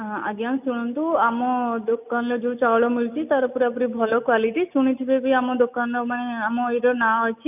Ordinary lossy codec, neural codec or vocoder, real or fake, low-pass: none; none; real; 3.6 kHz